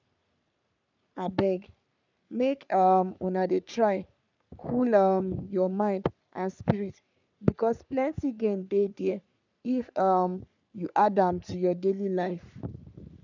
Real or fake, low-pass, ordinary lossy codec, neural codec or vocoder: fake; 7.2 kHz; none; codec, 44.1 kHz, 3.4 kbps, Pupu-Codec